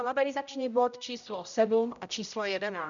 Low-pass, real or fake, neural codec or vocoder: 7.2 kHz; fake; codec, 16 kHz, 0.5 kbps, X-Codec, HuBERT features, trained on general audio